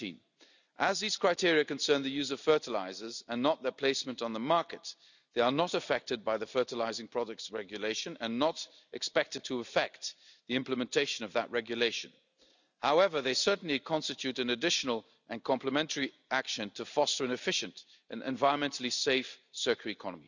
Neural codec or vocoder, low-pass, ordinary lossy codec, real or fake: none; 7.2 kHz; none; real